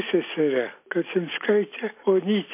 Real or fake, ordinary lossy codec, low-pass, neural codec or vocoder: real; MP3, 24 kbps; 3.6 kHz; none